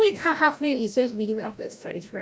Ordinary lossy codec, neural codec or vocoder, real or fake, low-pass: none; codec, 16 kHz, 0.5 kbps, FreqCodec, larger model; fake; none